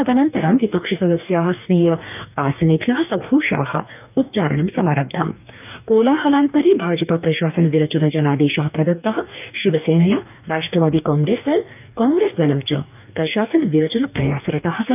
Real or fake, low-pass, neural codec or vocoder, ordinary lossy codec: fake; 3.6 kHz; codec, 44.1 kHz, 2.6 kbps, DAC; none